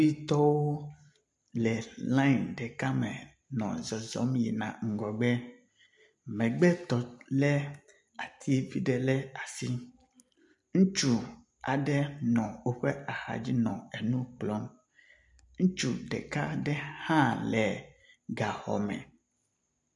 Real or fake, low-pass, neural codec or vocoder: real; 10.8 kHz; none